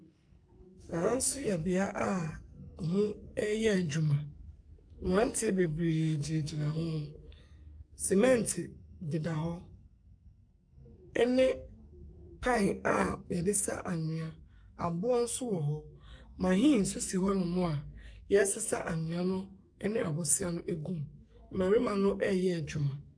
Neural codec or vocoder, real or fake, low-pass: codec, 44.1 kHz, 2.6 kbps, SNAC; fake; 9.9 kHz